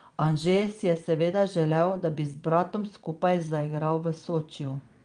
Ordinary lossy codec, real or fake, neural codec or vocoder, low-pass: Opus, 32 kbps; fake; vocoder, 22.05 kHz, 80 mel bands, WaveNeXt; 9.9 kHz